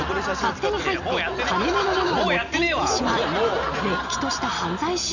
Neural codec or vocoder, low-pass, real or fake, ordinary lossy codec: none; 7.2 kHz; real; none